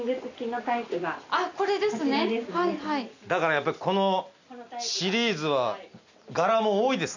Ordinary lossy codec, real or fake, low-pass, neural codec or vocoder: none; real; 7.2 kHz; none